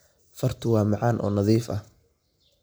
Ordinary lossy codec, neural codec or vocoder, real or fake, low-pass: none; none; real; none